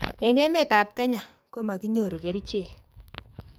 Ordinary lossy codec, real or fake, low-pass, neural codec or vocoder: none; fake; none; codec, 44.1 kHz, 2.6 kbps, SNAC